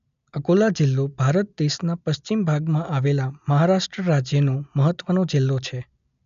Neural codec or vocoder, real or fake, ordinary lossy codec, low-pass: none; real; MP3, 96 kbps; 7.2 kHz